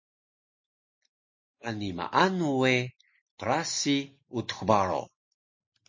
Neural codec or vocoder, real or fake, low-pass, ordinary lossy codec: none; real; 7.2 kHz; MP3, 32 kbps